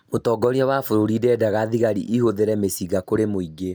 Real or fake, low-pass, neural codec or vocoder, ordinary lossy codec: real; none; none; none